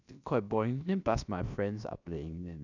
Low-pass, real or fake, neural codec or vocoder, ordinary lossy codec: 7.2 kHz; fake; codec, 16 kHz, about 1 kbps, DyCAST, with the encoder's durations; none